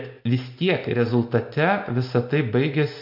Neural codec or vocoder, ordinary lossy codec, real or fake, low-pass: none; MP3, 48 kbps; real; 5.4 kHz